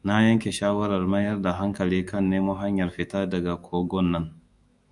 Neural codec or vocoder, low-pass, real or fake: autoencoder, 48 kHz, 128 numbers a frame, DAC-VAE, trained on Japanese speech; 10.8 kHz; fake